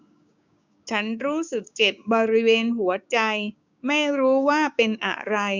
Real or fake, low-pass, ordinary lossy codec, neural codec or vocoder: fake; 7.2 kHz; none; codec, 16 kHz, 6 kbps, DAC